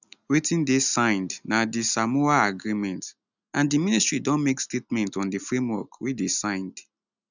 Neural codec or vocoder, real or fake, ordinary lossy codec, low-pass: none; real; none; 7.2 kHz